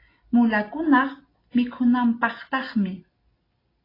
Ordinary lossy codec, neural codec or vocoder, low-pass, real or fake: AAC, 24 kbps; none; 5.4 kHz; real